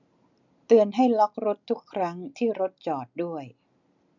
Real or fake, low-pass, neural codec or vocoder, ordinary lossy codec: real; 7.2 kHz; none; none